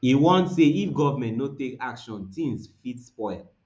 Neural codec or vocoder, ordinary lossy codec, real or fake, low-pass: none; none; real; none